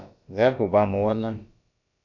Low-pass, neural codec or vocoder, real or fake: 7.2 kHz; codec, 16 kHz, about 1 kbps, DyCAST, with the encoder's durations; fake